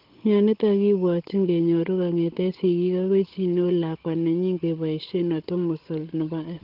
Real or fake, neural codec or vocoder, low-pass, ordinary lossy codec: fake; codec, 16 kHz, 16 kbps, FunCodec, trained on Chinese and English, 50 frames a second; 5.4 kHz; Opus, 16 kbps